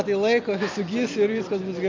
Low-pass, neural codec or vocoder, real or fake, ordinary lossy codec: 7.2 kHz; none; real; MP3, 64 kbps